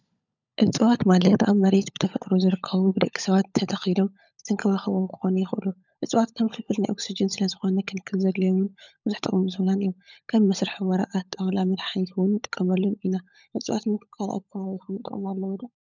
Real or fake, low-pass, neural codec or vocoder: fake; 7.2 kHz; codec, 16 kHz, 16 kbps, FunCodec, trained on LibriTTS, 50 frames a second